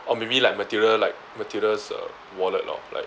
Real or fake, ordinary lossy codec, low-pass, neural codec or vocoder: real; none; none; none